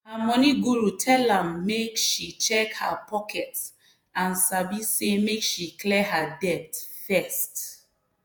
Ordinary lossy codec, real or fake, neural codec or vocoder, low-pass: none; fake; vocoder, 48 kHz, 128 mel bands, Vocos; none